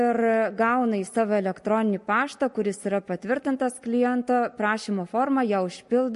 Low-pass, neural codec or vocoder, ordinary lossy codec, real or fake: 14.4 kHz; none; MP3, 48 kbps; real